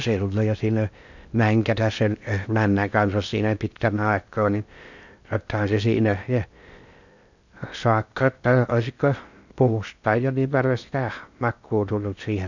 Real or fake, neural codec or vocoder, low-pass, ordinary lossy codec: fake; codec, 16 kHz in and 24 kHz out, 0.6 kbps, FocalCodec, streaming, 4096 codes; 7.2 kHz; none